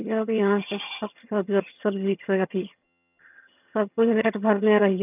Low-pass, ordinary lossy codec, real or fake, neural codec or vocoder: 3.6 kHz; none; fake; vocoder, 22.05 kHz, 80 mel bands, HiFi-GAN